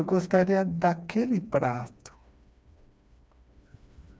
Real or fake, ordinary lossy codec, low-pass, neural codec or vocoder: fake; none; none; codec, 16 kHz, 2 kbps, FreqCodec, smaller model